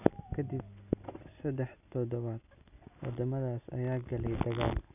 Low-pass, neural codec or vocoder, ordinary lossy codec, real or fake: 3.6 kHz; none; none; real